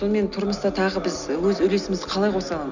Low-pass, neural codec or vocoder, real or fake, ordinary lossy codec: 7.2 kHz; none; real; none